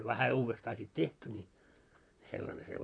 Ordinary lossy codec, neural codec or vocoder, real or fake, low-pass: none; vocoder, 44.1 kHz, 128 mel bands, Pupu-Vocoder; fake; 9.9 kHz